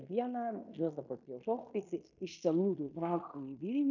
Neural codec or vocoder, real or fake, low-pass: codec, 16 kHz in and 24 kHz out, 0.9 kbps, LongCat-Audio-Codec, fine tuned four codebook decoder; fake; 7.2 kHz